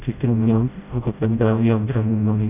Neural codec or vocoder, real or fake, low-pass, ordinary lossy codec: codec, 16 kHz, 0.5 kbps, FreqCodec, smaller model; fake; 3.6 kHz; none